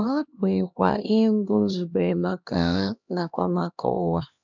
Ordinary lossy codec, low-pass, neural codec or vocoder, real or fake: none; 7.2 kHz; codec, 16 kHz, 1 kbps, X-Codec, HuBERT features, trained on LibriSpeech; fake